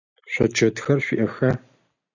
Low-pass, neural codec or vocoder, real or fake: 7.2 kHz; none; real